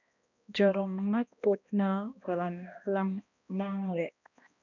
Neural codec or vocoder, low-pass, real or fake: codec, 16 kHz, 1 kbps, X-Codec, HuBERT features, trained on balanced general audio; 7.2 kHz; fake